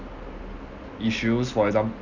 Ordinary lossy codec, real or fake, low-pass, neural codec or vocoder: none; real; 7.2 kHz; none